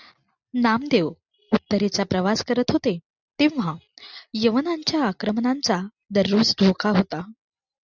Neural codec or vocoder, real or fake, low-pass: none; real; 7.2 kHz